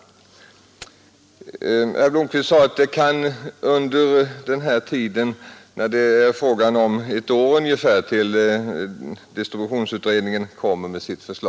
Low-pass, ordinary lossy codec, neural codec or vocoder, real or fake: none; none; none; real